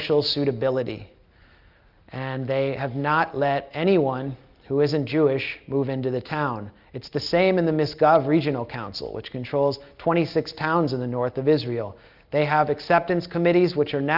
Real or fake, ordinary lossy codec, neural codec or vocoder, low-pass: real; Opus, 32 kbps; none; 5.4 kHz